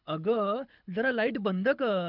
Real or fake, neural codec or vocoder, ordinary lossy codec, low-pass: fake; codec, 24 kHz, 6 kbps, HILCodec; none; 5.4 kHz